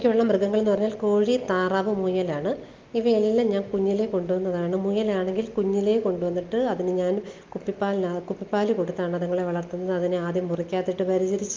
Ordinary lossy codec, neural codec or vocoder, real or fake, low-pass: Opus, 24 kbps; none; real; 7.2 kHz